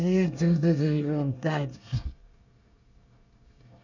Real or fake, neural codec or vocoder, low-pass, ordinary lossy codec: fake; codec, 24 kHz, 1 kbps, SNAC; 7.2 kHz; none